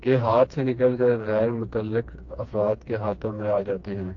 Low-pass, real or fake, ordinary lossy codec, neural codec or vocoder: 7.2 kHz; fake; AAC, 48 kbps; codec, 16 kHz, 2 kbps, FreqCodec, smaller model